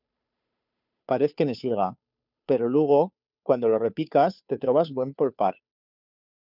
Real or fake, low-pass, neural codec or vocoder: fake; 5.4 kHz; codec, 16 kHz, 2 kbps, FunCodec, trained on Chinese and English, 25 frames a second